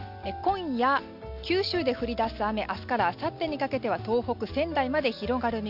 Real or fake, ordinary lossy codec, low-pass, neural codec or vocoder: real; none; 5.4 kHz; none